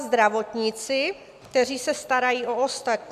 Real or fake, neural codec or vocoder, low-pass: real; none; 14.4 kHz